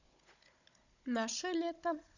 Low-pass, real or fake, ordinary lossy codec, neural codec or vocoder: 7.2 kHz; fake; none; codec, 16 kHz, 16 kbps, FunCodec, trained on Chinese and English, 50 frames a second